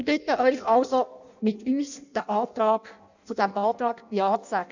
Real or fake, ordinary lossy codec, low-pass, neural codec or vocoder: fake; none; 7.2 kHz; codec, 16 kHz in and 24 kHz out, 0.6 kbps, FireRedTTS-2 codec